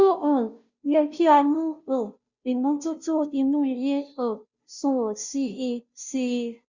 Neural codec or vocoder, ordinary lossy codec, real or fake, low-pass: codec, 16 kHz, 0.5 kbps, FunCodec, trained on Chinese and English, 25 frames a second; Opus, 64 kbps; fake; 7.2 kHz